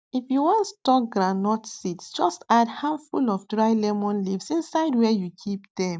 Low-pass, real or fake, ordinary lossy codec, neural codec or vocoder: none; real; none; none